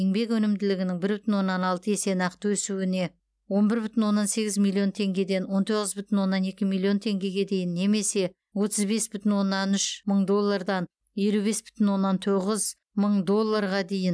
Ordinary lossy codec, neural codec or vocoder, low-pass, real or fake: none; none; none; real